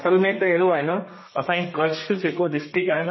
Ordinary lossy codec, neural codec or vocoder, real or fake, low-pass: MP3, 24 kbps; codec, 32 kHz, 1.9 kbps, SNAC; fake; 7.2 kHz